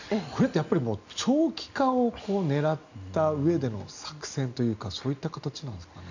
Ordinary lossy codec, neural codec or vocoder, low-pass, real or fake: none; none; 7.2 kHz; real